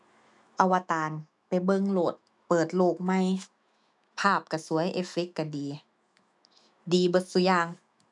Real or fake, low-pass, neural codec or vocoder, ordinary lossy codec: fake; 10.8 kHz; autoencoder, 48 kHz, 128 numbers a frame, DAC-VAE, trained on Japanese speech; none